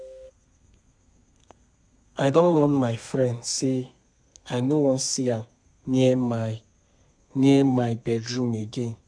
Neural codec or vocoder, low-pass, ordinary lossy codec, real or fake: codec, 32 kHz, 1.9 kbps, SNAC; 9.9 kHz; none; fake